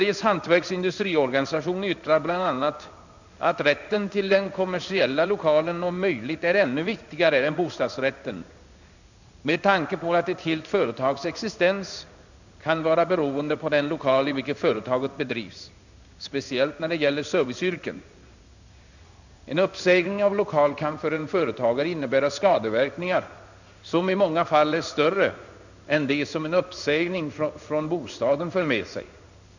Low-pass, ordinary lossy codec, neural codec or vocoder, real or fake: 7.2 kHz; none; codec, 16 kHz in and 24 kHz out, 1 kbps, XY-Tokenizer; fake